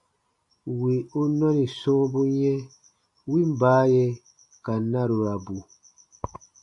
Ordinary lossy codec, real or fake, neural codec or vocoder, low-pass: AAC, 64 kbps; real; none; 10.8 kHz